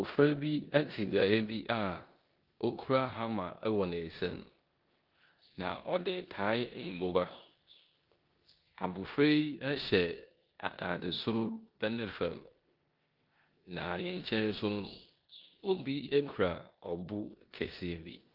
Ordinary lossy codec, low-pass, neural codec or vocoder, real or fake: Opus, 32 kbps; 5.4 kHz; codec, 16 kHz in and 24 kHz out, 0.9 kbps, LongCat-Audio-Codec, four codebook decoder; fake